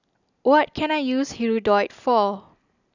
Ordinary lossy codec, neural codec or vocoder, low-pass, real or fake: none; none; 7.2 kHz; real